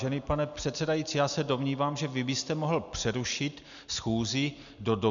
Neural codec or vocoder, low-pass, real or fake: none; 7.2 kHz; real